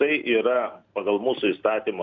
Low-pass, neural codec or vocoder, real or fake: 7.2 kHz; none; real